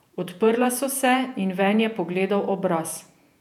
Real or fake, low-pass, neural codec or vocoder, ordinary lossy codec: fake; 19.8 kHz; vocoder, 48 kHz, 128 mel bands, Vocos; none